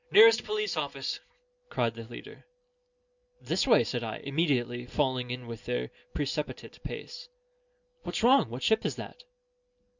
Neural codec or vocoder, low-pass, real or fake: none; 7.2 kHz; real